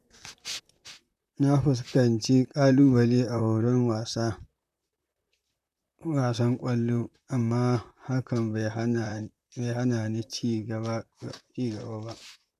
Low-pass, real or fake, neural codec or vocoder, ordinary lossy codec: 14.4 kHz; fake; vocoder, 44.1 kHz, 128 mel bands, Pupu-Vocoder; none